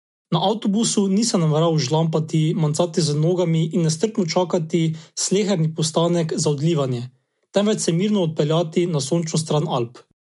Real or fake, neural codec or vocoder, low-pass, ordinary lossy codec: real; none; 10.8 kHz; MP3, 64 kbps